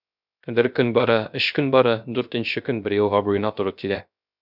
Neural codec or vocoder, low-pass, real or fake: codec, 16 kHz, 0.3 kbps, FocalCodec; 5.4 kHz; fake